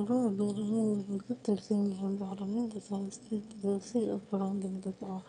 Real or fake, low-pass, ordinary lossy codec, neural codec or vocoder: fake; 9.9 kHz; none; autoencoder, 22.05 kHz, a latent of 192 numbers a frame, VITS, trained on one speaker